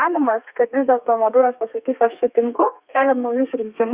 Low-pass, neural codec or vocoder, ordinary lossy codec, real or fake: 3.6 kHz; codec, 32 kHz, 1.9 kbps, SNAC; Opus, 64 kbps; fake